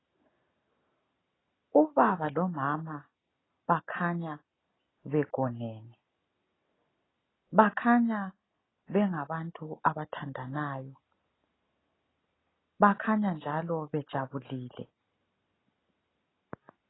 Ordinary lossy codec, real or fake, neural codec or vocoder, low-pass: AAC, 16 kbps; real; none; 7.2 kHz